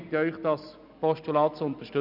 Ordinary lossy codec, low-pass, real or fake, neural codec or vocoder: none; 5.4 kHz; real; none